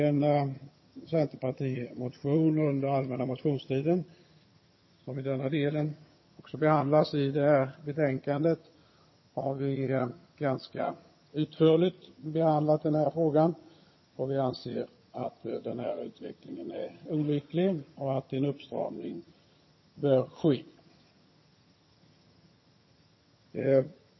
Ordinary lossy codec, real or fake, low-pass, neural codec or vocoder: MP3, 24 kbps; fake; 7.2 kHz; vocoder, 22.05 kHz, 80 mel bands, HiFi-GAN